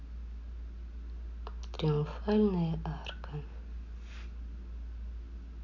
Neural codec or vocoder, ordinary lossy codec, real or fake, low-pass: none; none; real; 7.2 kHz